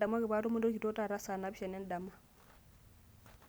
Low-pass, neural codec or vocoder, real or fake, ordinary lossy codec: none; none; real; none